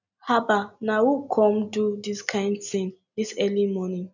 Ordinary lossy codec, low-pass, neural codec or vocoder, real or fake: none; 7.2 kHz; none; real